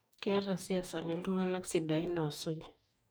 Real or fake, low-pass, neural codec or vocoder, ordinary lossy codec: fake; none; codec, 44.1 kHz, 2.6 kbps, DAC; none